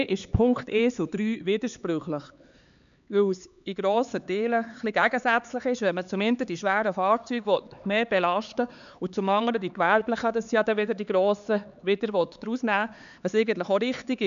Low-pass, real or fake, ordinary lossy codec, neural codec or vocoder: 7.2 kHz; fake; none; codec, 16 kHz, 4 kbps, X-Codec, HuBERT features, trained on LibriSpeech